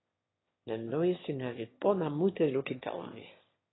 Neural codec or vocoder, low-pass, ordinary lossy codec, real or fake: autoencoder, 22.05 kHz, a latent of 192 numbers a frame, VITS, trained on one speaker; 7.2 kHz; AAC, 16 kbps; fake